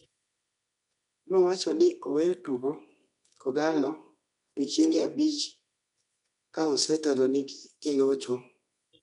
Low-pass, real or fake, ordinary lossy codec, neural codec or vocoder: 10.8 kHz; fake; none; codec, 24 kHz, 0.9 kbps, WavTokenizer, medium music audio release